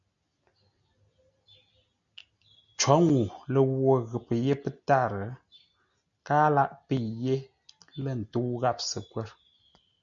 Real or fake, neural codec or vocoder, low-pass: real; none; 7.2 kHz